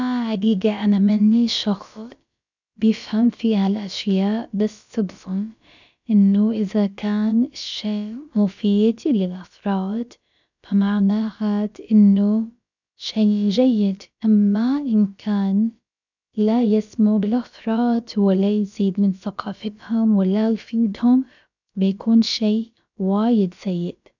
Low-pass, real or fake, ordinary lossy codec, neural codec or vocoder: 7.2 kHz; fake; none; codec, 16 kHz, about 1 kbps, DyCAST, with the encoder's durations